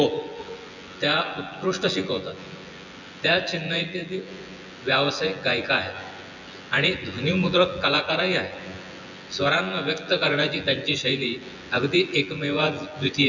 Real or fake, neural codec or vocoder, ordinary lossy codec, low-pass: fake; vocoder, 24 kHz, 100 mel bands, Vocos; none; 7.2 kHz